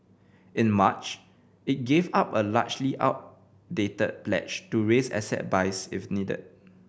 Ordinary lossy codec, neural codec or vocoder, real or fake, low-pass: none; none; real; none